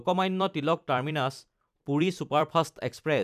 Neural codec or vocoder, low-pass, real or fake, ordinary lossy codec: vocoder, 44.1 kHz, 128 mel bands every 256 samples, BigVGAN v2; 14.4 kHz; fake; none